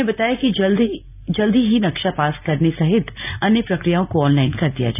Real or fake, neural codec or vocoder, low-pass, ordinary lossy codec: real; none; 3.6 kHz; none